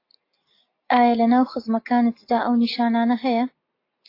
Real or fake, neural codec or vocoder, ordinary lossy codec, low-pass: real; none; AAC, 32 kbps; 5.4 kHz